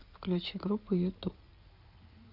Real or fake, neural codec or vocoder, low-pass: fake; codec, 16 kHz in and 24 kHz out, 2.2 kbps, FireRedTTS-2 codec; 5.4 kHz